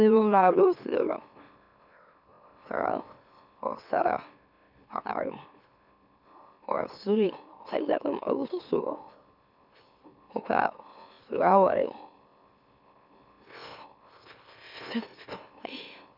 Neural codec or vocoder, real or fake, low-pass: autoencoder, 44.1 kHz, a latent of 192 numbers a frame, MeloTTS; fake; 5.4 kHz